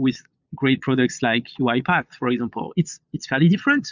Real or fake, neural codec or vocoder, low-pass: fake; vocoder, 22.05 kHz, 80 mel bands, Vocos; 7.2 kHz